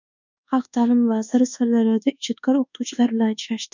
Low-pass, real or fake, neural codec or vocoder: 7.2 kHz; fake; codec, 24 kHz, 1.2 kbps, DualCodec